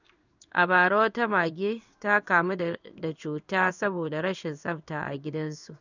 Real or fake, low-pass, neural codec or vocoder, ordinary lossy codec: fake; 7.2 kHz; codec, 16 kHz in and 24 kHz out, 1 kbps, XY-Tokenizer; none